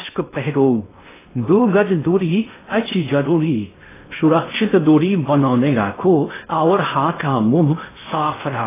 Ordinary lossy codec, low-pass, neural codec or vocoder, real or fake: AAC, 16 kbps; 3.6 kHz; codec, 16 kHz in and 24 kHz out, 0.6 kbps, FocalCodec, streaming, 2048 codes; fake